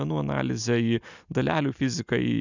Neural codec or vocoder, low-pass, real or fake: none; 7.2 kHz; real